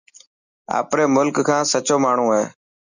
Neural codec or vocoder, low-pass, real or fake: none; 7.2 kHz; real